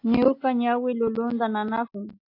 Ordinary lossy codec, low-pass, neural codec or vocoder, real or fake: AAC, 32 kbps; 5.4 kHz; none; real